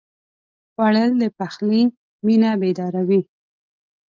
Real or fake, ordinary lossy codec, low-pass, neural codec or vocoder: real; Opus, 24 kbps; 7.2 kHz; none